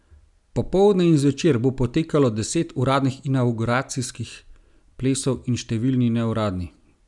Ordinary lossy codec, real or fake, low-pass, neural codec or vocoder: none; real; 10.8 kHz; none